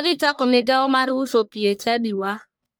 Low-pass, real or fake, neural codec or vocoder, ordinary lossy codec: none; fake; codec, 44.1 kHz, 1.7 kbps, Pupu-Codec; none